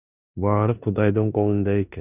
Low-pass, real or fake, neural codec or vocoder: 3.6 kHz; fake; codec, 16 kHz in and 24 kHz out, 0.9 kbps, LongCat-Audio-Codec, four codebook decoder